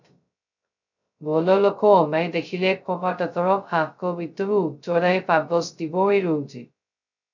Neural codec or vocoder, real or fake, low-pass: codec, 16 kHz, 0.2 kbps, FocalCodec; fake; 7.2 kHz